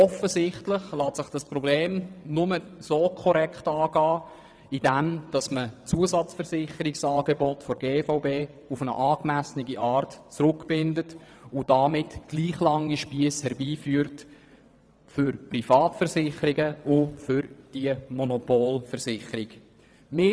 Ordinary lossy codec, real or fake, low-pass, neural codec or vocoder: none; fake; none; vocoder, 22.05 kHz, 80 mel bands, WaveNeXt